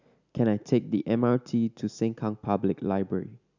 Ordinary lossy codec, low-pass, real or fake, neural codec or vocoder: none; 7.2 kHz; real; none